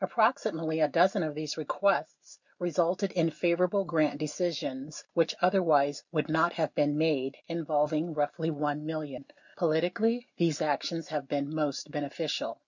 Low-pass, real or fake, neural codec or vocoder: 7.2 kHz; real; none